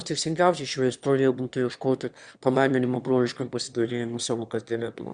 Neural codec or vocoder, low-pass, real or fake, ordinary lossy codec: autoencoder, 22.05 kHz, a latent of 192 numbers a frame, VITS, trained on one speaker; 9.9 kHz; fake; Opus, 64 kbps